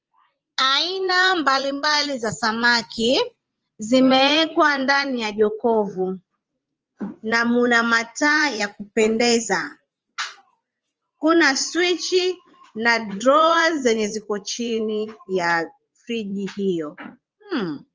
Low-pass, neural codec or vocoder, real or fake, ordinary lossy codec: 7.2 kHz; vocoder, 44.1 kHz, 80 mel bands, Vocos; fake; Opus, 24 kbps